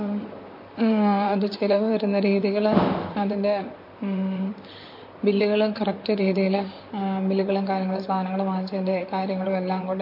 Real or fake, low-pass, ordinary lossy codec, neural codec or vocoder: fake; 5.4 kHz; MP3, 32 kbps; vocoder, 44.1 kHz, 128 mel bands, Pupu-Vocoder